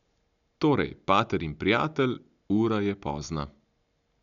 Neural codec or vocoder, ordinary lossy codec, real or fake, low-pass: none; none; real; 7.2 kHz